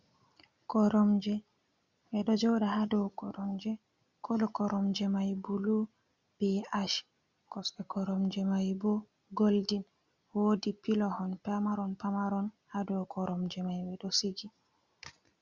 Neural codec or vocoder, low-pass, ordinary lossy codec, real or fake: none; 7.2 kHz; Opus, 64 kbps; real